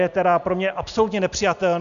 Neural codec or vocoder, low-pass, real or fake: none; 7.2 kHz; real